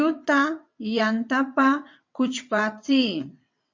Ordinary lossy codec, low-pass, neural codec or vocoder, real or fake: MP3, 48 kbps; 7.2 kHz; vocoder, 22.05 kHz, 80 mel bands, WaveNeXt; fake